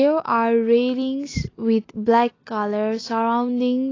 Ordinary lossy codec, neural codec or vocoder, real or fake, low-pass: AAC, 32 kbps; none; real; 7.2 kHz